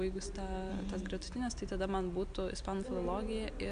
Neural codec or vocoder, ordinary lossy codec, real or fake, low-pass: none; AAC, 96 kbps; real; 9.9 kHz